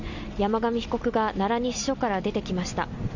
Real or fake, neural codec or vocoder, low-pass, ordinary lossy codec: real; none; 7.2 kHz; none